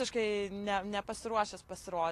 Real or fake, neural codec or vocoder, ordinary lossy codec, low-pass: real; none; AAC, 48 kbps; 14.4 kHz